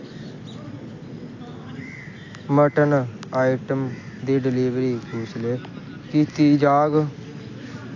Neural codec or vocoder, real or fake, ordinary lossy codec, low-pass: none; real; AAC, 48 kbps; 7.2 kHz